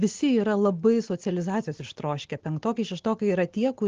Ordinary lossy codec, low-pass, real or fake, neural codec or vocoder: Opus, 16 kbps; 7.2 kHz; real; none